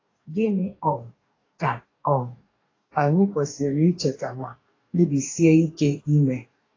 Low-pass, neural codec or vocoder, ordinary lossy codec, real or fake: 7.2 kHz; codec, 44.1 kHz, 2.6 kbps, DAC; AAC, 32 kbps; fake